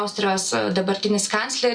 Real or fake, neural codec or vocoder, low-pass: real; none; 9.9 kHz